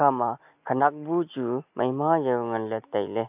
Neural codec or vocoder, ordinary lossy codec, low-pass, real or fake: none; AAC, 32 kbps; 3.6 kHz; real